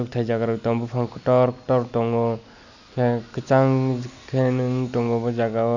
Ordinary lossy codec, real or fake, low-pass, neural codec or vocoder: none; real; 7.2 kHz; none